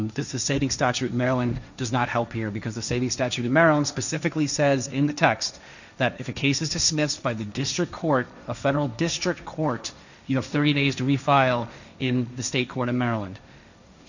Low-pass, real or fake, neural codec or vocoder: 7.2 kHz; fake; codec, 16 kHz, 1.1 kbps, Voila-Tokenizer